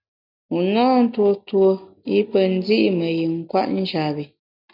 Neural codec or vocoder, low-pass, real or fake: none; 5.4 kHz; real